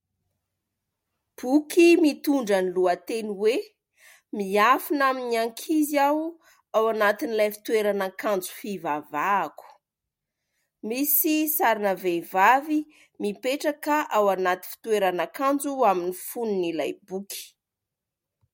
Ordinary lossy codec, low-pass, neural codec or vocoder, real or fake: MP3, 64 kbps; 19.8 kHz; none; real